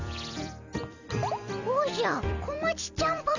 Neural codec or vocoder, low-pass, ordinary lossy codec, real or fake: vocoder, 44.1 kHz, 128 mel bands every 256 samples, BigVGAN v2; 7.2 kHz; none; fake